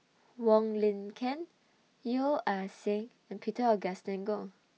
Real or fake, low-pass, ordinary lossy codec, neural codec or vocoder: real; none; none; none